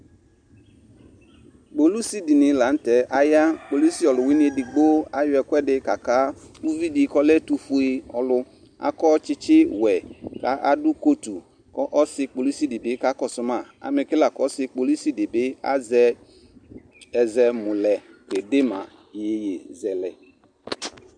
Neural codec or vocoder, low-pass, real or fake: none; 9.9 kHz; real